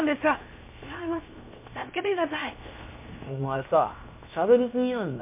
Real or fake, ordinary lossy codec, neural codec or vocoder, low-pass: fake; MP3, 24 kbps; codec, 16 kHz, 0.7 kbps, FocalCodec; 3.6 kHz